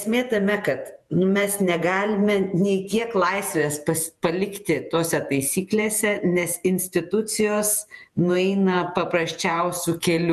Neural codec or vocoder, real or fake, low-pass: vocoder, 48 kHz, 128 mel bands, Vocos; fake; 14.4 kHz